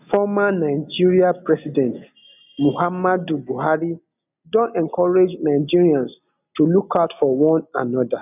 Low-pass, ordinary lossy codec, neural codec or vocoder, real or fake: 3.6 kHz; none; none; real